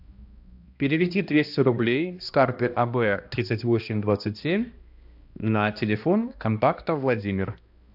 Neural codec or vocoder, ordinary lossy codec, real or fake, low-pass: codec, 16 kHz, 1 kbps, X-Codec, HuBERT features, trained on balanced general audio; AAC, 48 kbps; fake; 5.4 kHz